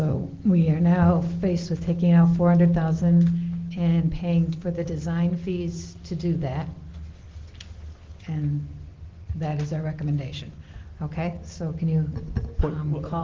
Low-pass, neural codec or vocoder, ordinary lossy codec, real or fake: 7.2 kHz; none; Opus, 16 kbps; real